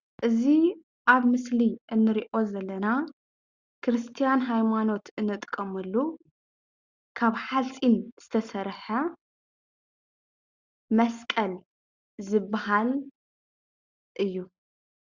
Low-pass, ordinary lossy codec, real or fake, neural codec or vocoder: 7.2 kHz; Opus, 64 kbps; real; none